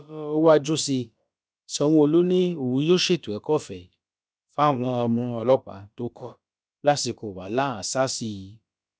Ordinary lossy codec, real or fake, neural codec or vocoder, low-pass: none; fake; codec, 16 kHz, about 1 kbps, DyCAST, with the encoder's durations; none